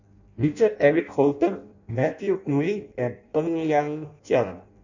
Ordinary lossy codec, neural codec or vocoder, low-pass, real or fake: none; codec, 16 kHz in and 24 kHz out, 0.6 kbps, FireRedTTS-2 codec; 7.2 kHz; fake